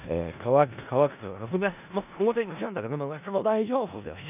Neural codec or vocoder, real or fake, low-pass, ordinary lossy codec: codec, 16 kHz in and 24 kHz out, 0.4 kbps, LongCat-Audio-Codec, four codebook decoder; fake; 3.6 kHz; none